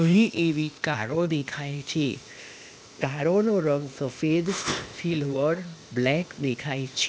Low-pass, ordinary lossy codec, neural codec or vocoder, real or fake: none; none; codec, 16 kHz, 0.8 kbps, ZipCodec; fake